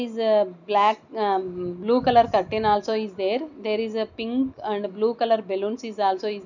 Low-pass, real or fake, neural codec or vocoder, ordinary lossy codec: 7.2 kHz; real; none; none